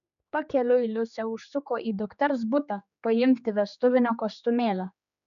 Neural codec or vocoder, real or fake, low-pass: codec, 16 kHz, 4 kbps, X-Codec, HuBERT features, trained on general audio; fake; 7.2 kHz